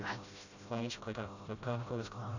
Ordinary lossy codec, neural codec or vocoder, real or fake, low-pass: none; codec, 16 kHz, 0.5 kbps, FreqCodec, smaller model; fake; 7.2 kHz